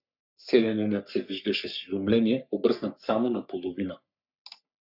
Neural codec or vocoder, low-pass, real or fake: codec, 44.1 kHz, 3.4 kbps, Pupu-Codec; 5.4 kHz; fake